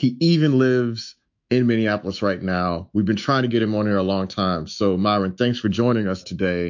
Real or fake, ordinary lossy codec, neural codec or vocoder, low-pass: fake; MP3, 48 kbps; autoencoder, 48 kHz, 128 numbers a frame, DAC-VAE, trained on Japanese speech; 7.2 kHz